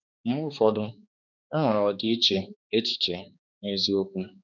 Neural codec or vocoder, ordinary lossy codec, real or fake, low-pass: codec, 16 kHz, 2 kbps, X-Codec, HuBERT features, trained on balanced general audio; none; fake; 7.2 kHz